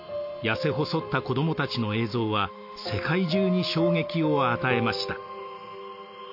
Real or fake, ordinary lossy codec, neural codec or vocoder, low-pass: real; MP3, 32 kbps; none; 5.4 kHz